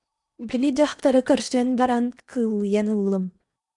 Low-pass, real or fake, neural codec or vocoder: 10.8 kHz; fake; codec, 16 kHz in and 24 kHz out, 0.8 kbps, FocalCodec, streaming, 65536 codes